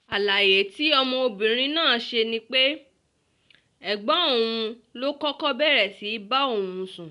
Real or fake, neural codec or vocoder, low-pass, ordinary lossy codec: real; none; 10.8 kHz; none